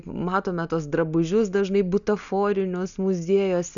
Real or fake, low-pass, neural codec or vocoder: real; 7.2 kHz; none